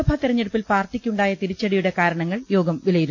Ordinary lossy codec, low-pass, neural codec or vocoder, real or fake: none; 7.2 kHz; none; real